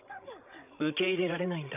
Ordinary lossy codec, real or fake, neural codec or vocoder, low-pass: none; fake; codec, 16 kHz, 16 kbps, FreqCodec, larger model; 3.6 kHz